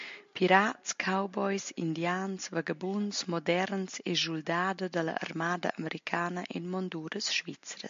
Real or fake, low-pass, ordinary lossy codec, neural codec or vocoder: real; 7.2 kHz; MP3, 48 kbps; none